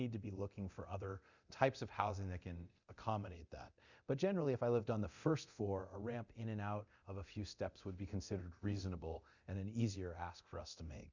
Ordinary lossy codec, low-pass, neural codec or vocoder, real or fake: Opus, 64 kbps; 7.2 kHz; codec, 24 kHz, 0.9 kbps, DualCodec; fake